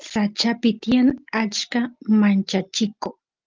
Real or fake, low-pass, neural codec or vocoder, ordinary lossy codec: real; 7.2 kHz; none; Opus, 24 kbps